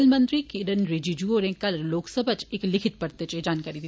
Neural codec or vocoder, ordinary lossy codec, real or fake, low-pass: none; none; real; none